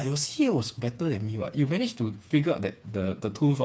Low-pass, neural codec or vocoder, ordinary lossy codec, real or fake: none; codec, 16 kHz, 4 kbps, FreqCodec, smaller model; none; fake